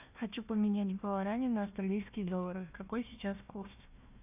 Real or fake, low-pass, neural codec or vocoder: fake; 3.6 kHz; codec, 16 kHz, 1 kbps, FunCodec, trained on Chinese and English, 50 frames a second